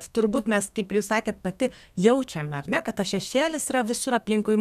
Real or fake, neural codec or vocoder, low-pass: fake; codec, 32 kHz, 1.9 kbps, SNAC; 14.4 kHz